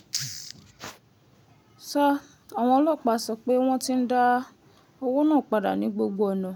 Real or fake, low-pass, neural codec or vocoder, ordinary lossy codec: real; none; none; none